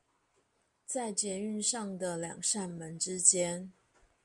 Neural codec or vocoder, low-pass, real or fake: none; 10.8 kHz; real